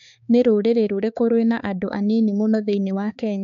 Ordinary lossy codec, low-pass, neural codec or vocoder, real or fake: MP3, 64 kbps; 7.2 kHz; codec, 16 kHz, 4 kbps, X-Codec, HuBERT features, trained on balanced general audio; fake